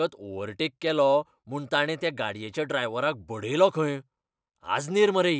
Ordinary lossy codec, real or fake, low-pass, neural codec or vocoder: none; real; none; none